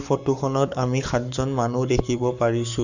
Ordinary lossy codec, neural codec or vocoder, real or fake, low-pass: none; codec, 44.1 kHz, 7.8 kbps, Pupu-Codec; fake; 7.2 kHz